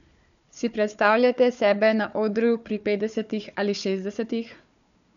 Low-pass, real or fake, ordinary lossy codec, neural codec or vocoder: 7.2 kHz; fake; none; codec, 16 kHz, 4 kbps, FunCodec, trained on Chinese and English, 50 frames a second